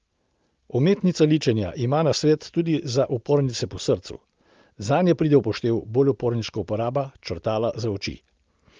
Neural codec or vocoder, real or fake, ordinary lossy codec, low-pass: none; real; Opus, 32 kbps; 7.2 kHz